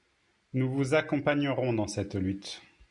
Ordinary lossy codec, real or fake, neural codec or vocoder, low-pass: MP3, 96 kbps; real; none; 10.8 kHz